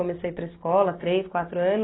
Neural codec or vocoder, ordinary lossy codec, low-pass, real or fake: none; AAC, 16 kbps; 7.2 kHz; real